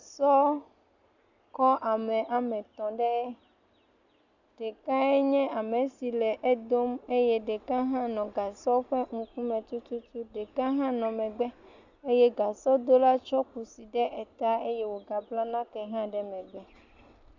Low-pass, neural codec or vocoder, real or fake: 7.2 kHz; none; real